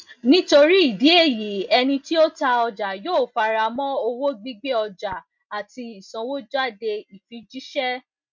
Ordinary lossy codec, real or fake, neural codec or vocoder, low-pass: MP3, 64 kbps; real; none; 7.2 kHz